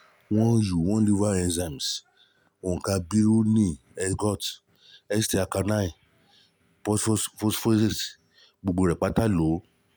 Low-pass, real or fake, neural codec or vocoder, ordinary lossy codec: none; real; none; none